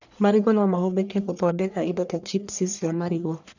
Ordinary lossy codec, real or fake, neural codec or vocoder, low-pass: none; fake; codec, 44.1 kHz, 1.7 kbps, Pupu-Codec; 7.2 kHz